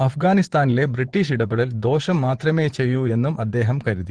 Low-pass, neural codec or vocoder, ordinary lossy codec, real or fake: 9.9 kHz; vocoder, 24 kHz, 100 mel bands, Vocos; Opus, 16 kbps; fake